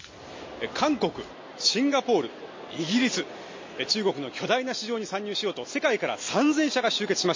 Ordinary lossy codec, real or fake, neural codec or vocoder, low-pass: MP3, 32 kbps; real; none; 7.2 kHz